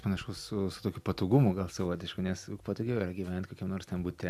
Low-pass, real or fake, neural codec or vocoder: 14.4 kHz; real; none